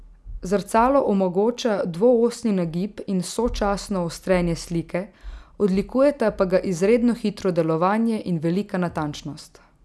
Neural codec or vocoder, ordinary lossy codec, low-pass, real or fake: none; none; none; real